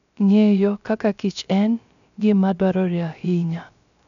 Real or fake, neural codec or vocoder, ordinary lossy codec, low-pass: fake; codec, 16 kHz, 0.3 kbps, FocalCodec; none; 7.2 kHz